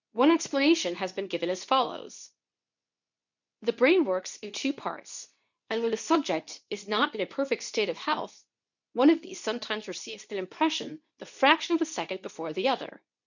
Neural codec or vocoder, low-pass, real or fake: codec, 24 kHz, 0.9 kbps, WavTokenizer, medium speech release version 2; 7.2 kHz; fake